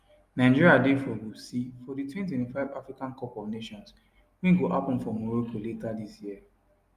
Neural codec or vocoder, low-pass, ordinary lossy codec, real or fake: none; 14.4 kHz; Opus, 32 kbps; real